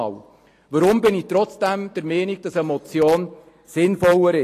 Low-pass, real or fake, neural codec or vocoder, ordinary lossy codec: 14.4 kHz; real; none; AAC, 48 kbps